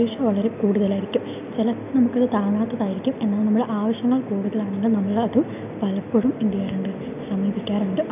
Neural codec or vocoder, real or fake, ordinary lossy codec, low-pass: none; real; none; 3.6 kHz